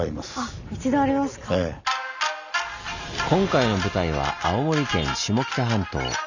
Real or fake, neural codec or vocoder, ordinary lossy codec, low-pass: real; none; none; 7.2 kHz